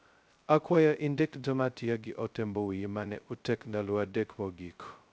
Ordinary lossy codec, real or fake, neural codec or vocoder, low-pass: none; fake; codec, 16 kHz, 0.2 kbps, FocalCodec; none